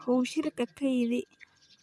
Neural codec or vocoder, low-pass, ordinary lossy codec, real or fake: vocoder, 24 kHz, 100 mel bands, Vocos; none; none; fake